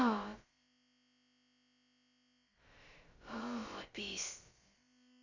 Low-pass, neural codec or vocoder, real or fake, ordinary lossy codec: 7.2 kHz; codec, 16 kHz, about 1 kbps, DyCAST, with the encoder's durations; fake; Opus, 64 kbps